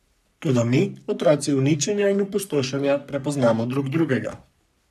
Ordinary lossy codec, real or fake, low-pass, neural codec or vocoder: none; fake; 14.4 kHz; codec, 44.1 kHz, 3.4 kbps, Pupu-Codec